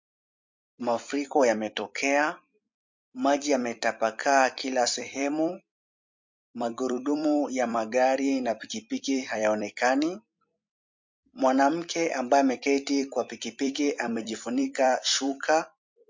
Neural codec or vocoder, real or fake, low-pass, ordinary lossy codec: vocoder, 44.1 kHz, 128 mel bands every 256 samples, BigVGAN v2; fake; 7.2 kHz; MP3, 48 kbps